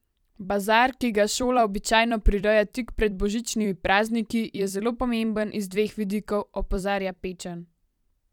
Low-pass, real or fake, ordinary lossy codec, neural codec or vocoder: 19.8 kHz; fake; none; vocoder, 44.1 kHz, 128 mel bands every 256 samples, BigVGAN v2